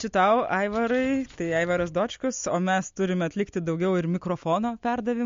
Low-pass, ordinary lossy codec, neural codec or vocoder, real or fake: 7.2 kHz; MP3, 48 kbps; none; real